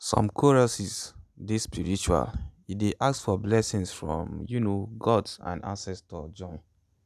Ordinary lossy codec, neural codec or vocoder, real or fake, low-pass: none; none; real; 14.4 kHz